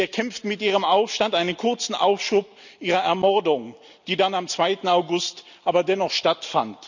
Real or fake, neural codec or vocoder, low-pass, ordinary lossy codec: real; none; 7.2 kHz; none